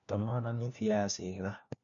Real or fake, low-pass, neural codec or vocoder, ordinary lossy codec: fake; 7.2 kHz; codec, 16 kHz, 1 kbps, FunCodec, trained on LibriTTS, 50 frames a second; none